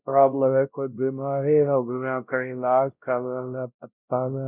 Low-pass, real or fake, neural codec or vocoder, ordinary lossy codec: 3.6 kHz; fake; codec, 16 kHz, 0.5 kbps, X-Codec, WavLM features, trained on Multilingual LibriSpeech; none